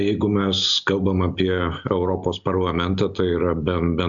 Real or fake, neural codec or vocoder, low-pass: real; none; 7.2 kHz